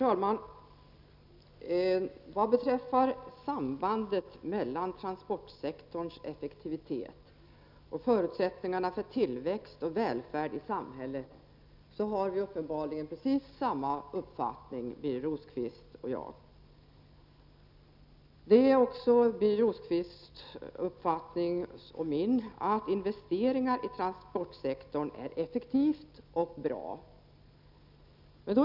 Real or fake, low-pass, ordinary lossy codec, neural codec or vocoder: real; 5.4 kHz; none; none